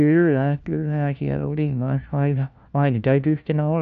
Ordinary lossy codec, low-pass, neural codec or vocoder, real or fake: none; 7.2 kHz; codec, 16 kHz, 1 kbps, FunCodec, trained on LibriTTS, 50 frames a second; fake